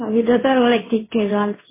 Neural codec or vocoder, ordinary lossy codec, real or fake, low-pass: codec, 16 kHz in and 24 kHz out, 1 kbps, XY-Tokenizer; MP3, 16 kbps; fake; 3.6 kHz